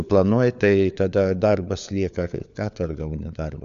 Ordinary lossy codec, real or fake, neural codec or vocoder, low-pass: AAC, 96 kbps; fake; codec, 16 kHz, 4 kbps, FunCodec, trained on LibriTTS, 50 frames a second; 7.2 kHz